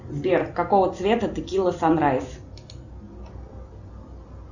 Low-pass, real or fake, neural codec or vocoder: 7.2 kHz; real; none